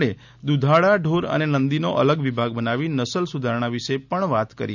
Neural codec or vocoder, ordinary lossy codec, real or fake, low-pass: none; none; real; 7.2 kHz